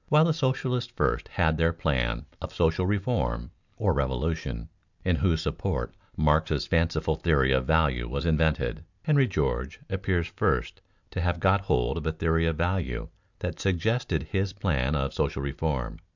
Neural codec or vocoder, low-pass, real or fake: none; 7.2 kHz; real